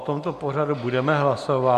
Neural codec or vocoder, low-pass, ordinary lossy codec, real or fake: none; 14.4 kHz; MP3, 96 kbps; real